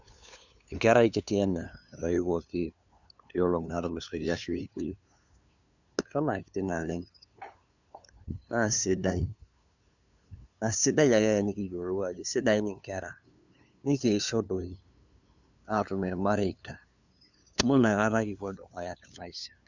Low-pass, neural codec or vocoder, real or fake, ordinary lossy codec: 7.2 kHz; codec, 16 kHz, 2 kbps, FunCodec, trained on LibriTTS, 25 frames a second; fake; none